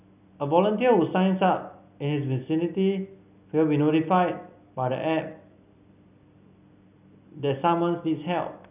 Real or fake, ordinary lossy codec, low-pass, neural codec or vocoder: real; none; 3.6 kHz; none